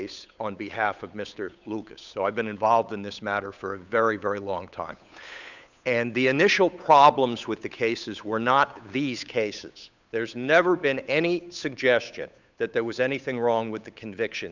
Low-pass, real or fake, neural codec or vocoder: 7.2 kHz; fake; codec, 16 kHz, 8 kbps, FunCodec, trained on Chinese and English, 25 frames a second